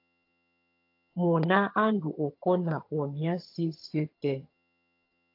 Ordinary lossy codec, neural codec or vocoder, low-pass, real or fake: AAC, 32 kbps; vocoder, 22.05 kHz, 80 mel bands, HiFi-GAN; 5.4 kHz; fake